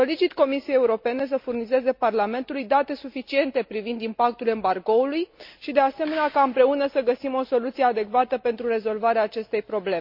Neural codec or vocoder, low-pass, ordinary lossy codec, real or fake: none; 5.4 kHz; MP3, 48 kbps; real